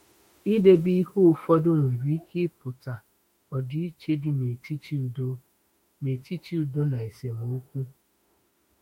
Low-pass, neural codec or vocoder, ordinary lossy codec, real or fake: 19.8 kHz; autoencoder, 48 kHz, 32 numbers a frame, DAC-VAE, trained on Japanese speech; MP3, 64 kbps; fake